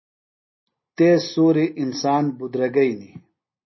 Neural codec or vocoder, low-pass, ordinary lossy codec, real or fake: none; 7.2 kHz; MP3, 24 kbps; real